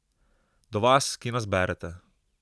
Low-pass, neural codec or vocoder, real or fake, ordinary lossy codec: none; none; real; none